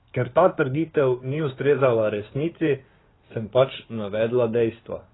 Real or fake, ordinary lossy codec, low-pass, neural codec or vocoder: fake; AAC, 16 kbps; 7.2 kHz; codec, 16 kHz in and 24 kHz out, 2.2 kbps, FireRedTTS-2 codec